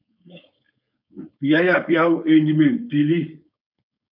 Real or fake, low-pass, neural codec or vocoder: fake; 5.4 kHz; codec, 16 kHz, 4.8 kbps, FACodec